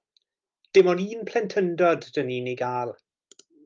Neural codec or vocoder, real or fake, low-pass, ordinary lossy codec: none; real; 7.2 kHz; Opus, 32 kbps